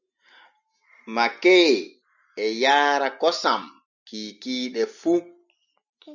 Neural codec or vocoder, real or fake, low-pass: none; real; 7.2 kHz